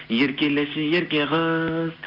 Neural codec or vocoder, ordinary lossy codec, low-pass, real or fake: none; none; 5.4 kHz; real